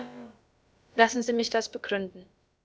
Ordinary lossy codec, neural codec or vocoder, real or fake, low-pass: none; codec, 16 kHz, about 1 kbps, DyCAST, with the encoder's durations; fake; none